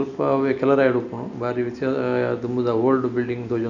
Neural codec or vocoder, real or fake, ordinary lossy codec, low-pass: none; real; none; 7.2 kHz